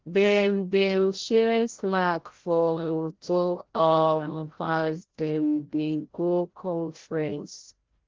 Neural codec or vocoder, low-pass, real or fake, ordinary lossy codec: codec, 16 kHz, 0.5 kbps, FreqCodec, larger model; 7.2 kHz; fake; Opus, 16 kbps